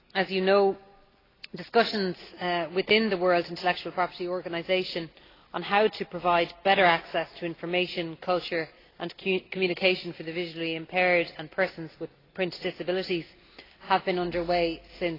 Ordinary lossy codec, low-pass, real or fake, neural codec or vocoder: AAC, 24 kbps; 5.4 kHz; real; none